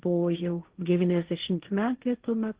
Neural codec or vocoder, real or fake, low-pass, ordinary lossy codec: codec, 16 kHz, 1.1 kbps, Voila-Tokenizer; fake; 3.6 kHz; Opus, 16 kbps